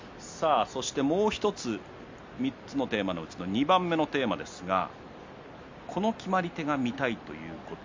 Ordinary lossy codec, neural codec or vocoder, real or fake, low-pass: MP3, 64 kbps; none; real; 7.2 kHz